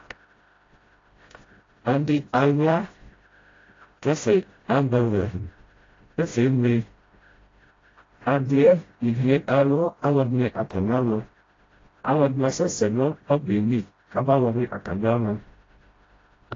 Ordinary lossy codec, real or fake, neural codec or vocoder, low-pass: AAC, 32 kbps; fake; codec, 16 kHz, 0.5 kbps, FreqCodec, smaller model; 7.2 kHz